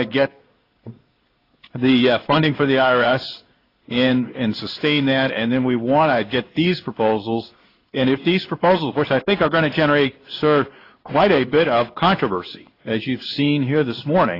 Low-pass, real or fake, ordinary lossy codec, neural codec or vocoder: 5.4 kHz; fake; AAC, 32 kbps; codec, 16 kHz in and 24 kHz out, 1 kbps, XY-Tokenizer